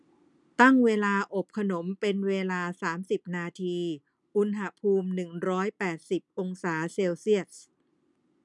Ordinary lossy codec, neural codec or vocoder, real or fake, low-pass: none; none; real; 10.8 kHz